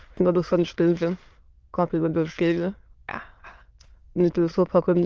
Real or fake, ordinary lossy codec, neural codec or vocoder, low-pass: fake; Opus, 24 kbps; autoencoder, 22.05 kHz, a latent of 192 numbers a frame, VITS, trained on many speakers; 7.2 kHz